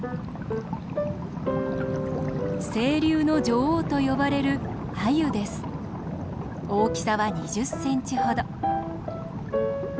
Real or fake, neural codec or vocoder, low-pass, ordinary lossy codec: real; none; none; none